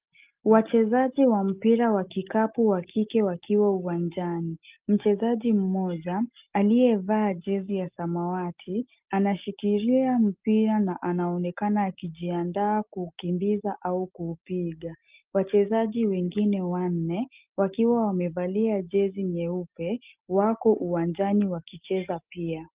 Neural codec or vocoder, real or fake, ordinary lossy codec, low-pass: none; real; Opus, 32 kbps; 3.6 kHz